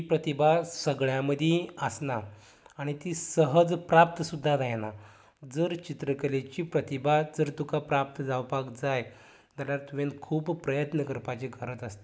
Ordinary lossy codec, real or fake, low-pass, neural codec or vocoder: none; real; none; none